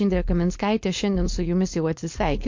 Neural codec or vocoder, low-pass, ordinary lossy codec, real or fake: codec, 16 kHz, 4.8 kbps, FACodec; 7.2 kHz; MP3, 48 kbps; fake